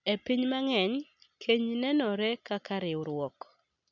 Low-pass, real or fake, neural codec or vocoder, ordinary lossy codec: 7.2 kHz; real; none; none